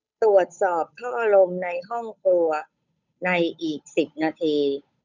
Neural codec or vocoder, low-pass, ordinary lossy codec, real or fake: codec, 16 kHz, 8 kbps, FunCodec, trained on Chinese and English, 25 frames a second; 7.2 kHz; none; fake